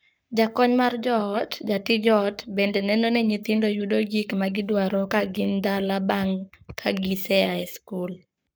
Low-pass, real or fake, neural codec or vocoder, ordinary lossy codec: none; fake; codec, 44.1 kHz, 7.8 kbps, Pupu-Codec; none